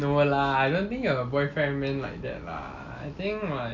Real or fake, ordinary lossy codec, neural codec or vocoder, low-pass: real; none; none; 7.2 kHz